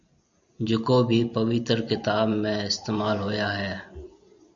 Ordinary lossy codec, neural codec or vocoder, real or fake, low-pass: MP3, 96 kbps; none; real; 7.2 kHz